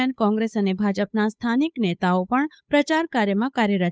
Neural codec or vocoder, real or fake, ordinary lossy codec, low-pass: codec, 16 kHz, 8 kbps, FunCodec, trained on Chinese and English, 25 frames a second; fake; none; none